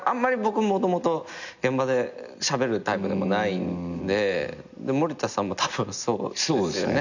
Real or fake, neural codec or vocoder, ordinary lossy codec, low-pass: real; none; none; 7.2 kHz